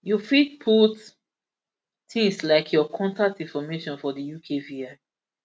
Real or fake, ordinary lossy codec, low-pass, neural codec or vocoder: real; none; none; none